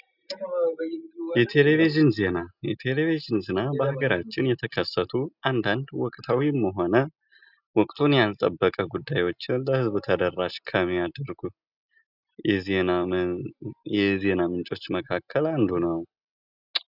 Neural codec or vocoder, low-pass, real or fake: none; 5.4 kHz; real